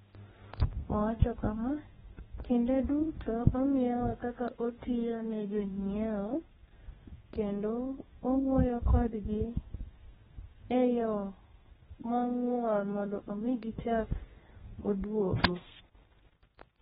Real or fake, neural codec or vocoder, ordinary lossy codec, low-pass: fake; codec, 32 kHz, 1.9 kbps, SNAC; AAC, 16 kbps; 14.4 kHz